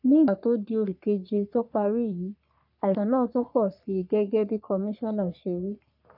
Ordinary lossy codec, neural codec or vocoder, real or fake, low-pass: none; codec, 44.1 kHz, 3.4 kbps, Pupu-Codec; fake; 5.4 kHz